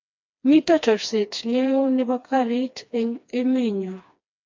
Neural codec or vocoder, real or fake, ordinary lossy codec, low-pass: codec, 16 kHz, 2 kbps, FreqCodec, smaller model; fake; MP3, 64 kbps; 7.2 kHz